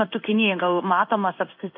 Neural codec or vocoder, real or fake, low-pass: codec, 16 kHz in and 24 kHz out, 1 kbps, XY-Tokenizer; fake; 5.4 kHz